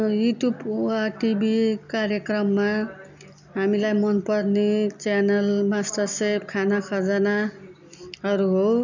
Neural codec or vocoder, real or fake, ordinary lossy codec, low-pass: none; real; none; 7.2 kHz